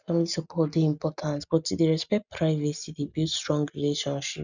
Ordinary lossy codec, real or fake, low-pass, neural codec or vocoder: none; real; 7.2 kHz; none